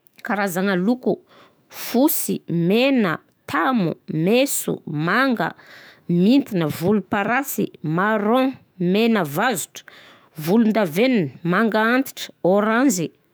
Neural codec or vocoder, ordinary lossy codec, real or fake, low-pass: autoencoder, 48 kHz, 128 numbers a frame, DAC-VAE, trained on Japanese speech; none; fake; none